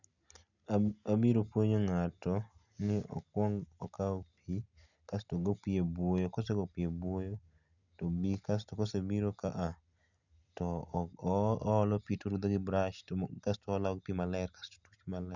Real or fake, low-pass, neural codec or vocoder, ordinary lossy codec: real; 7.2 kHz; none; none